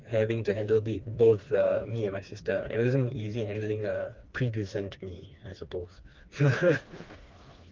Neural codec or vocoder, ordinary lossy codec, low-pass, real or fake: codec, 16 kHz, 2 kbps, FreqCodec, smaller model; Opus, 24 kbps; 7.2 kHz; fake